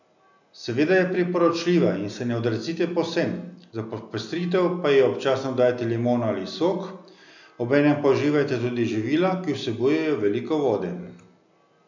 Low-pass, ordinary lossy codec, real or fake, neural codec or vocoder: 7.2 kHz; none; real; none